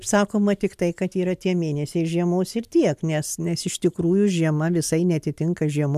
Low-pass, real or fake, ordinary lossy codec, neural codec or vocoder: 14.4 kHz; real; MP3, 96 kbps; none